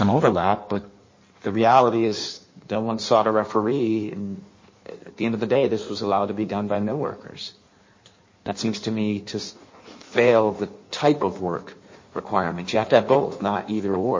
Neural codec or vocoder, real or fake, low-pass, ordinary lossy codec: codec, 16 kHz in and 24 kHz out, 1.1 kbps, FireRedTTS-2 codec; fake; 7.2 kHz; MP3, 32 kbps